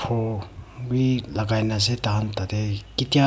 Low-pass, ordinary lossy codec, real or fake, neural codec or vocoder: none; none; real; none